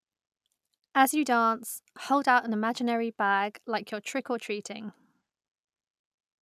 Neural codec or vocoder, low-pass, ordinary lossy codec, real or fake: none; 14.4 kHz; none; real